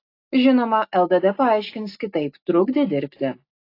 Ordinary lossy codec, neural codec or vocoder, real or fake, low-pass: AAC, 32 kbps; none; real; 5.4 kHz